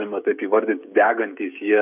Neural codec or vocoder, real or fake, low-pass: codec, 16 kHz, 16 kbps, FreqCodec, smaller model; fake; 3.6 kHz